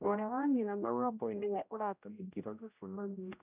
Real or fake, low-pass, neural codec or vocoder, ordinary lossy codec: fake; 3.6 kHz; codec, 16 kHz, 0.5 kbps, X-Codec, HuBERT features, trained on general audio; none